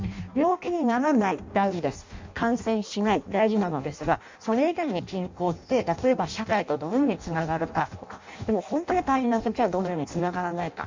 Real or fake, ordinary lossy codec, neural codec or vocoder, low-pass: fake; MP3, 64 kbps; codec, 16 kHz in and 24 kHz out, 0.6 kbps, FireRedTTS-2 codec; 7.2 kHz